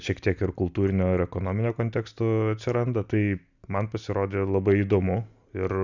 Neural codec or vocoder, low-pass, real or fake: none; 7.2 kHz; real